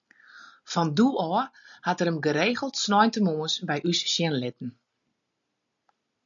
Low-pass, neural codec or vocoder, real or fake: 7.2 kHz; none; real